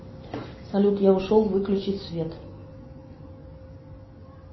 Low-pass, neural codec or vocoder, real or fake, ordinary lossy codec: 7.2 kHz; none; real; MP3, 24 kbps